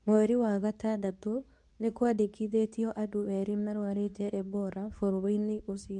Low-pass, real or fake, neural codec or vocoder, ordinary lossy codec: 10.8 kHz; fake; codec, 24 kHz, 0.9 kbps, WavTokenizer, medium speech release version 2; none